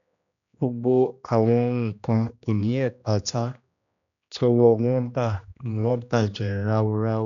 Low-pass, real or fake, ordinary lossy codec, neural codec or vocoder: 7.2 kHz; fake; none; codec, 16 kHz, 1 kbps, X-Codec, HuBERT features, trained on general audio